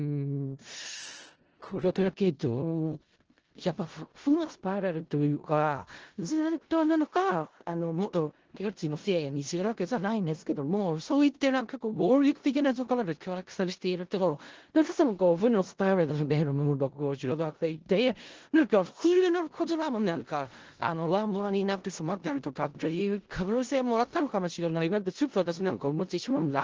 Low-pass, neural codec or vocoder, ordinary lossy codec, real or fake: 7.2 kHz; codec, 16 kHz in and 24 kHz out, 0.4 kbps, LongCat-Audio-Codec, four codebook decoder; Opus, 16 kbps; fake